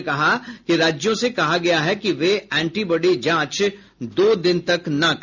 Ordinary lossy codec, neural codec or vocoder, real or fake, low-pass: none; none; real; 7.2 kHz